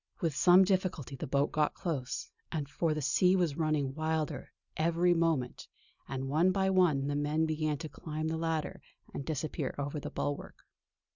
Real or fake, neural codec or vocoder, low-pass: real; none; 7.2 kHz